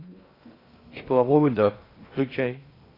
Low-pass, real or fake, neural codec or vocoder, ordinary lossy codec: 5.4 kHz; fake; codec, 16 kHz in and 24 kHz out, 0.6 kbps, FocalCodec, streaming, 2048 codes; AAC, 24 kbps